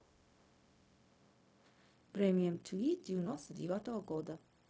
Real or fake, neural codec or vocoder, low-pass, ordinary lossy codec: fake; codec, 16 kHz, 0.4 kbps, LongCat-Audio-Codec; none; none